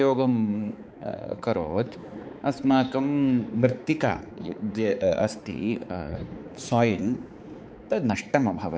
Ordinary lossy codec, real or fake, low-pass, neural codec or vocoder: none; fake; none; codec, 16 kHz, 4 kbps, X-Codec, HuBERT features, trained on balanced general audio